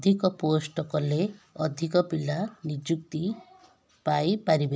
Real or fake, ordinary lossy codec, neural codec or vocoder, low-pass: real; none; none; none